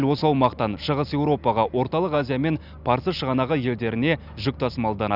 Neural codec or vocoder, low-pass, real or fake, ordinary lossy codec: none; 5.4 kHz; real; none